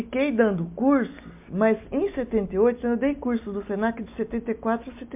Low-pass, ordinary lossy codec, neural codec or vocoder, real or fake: 3.6 kHz; MP3, 32 kbps; none; real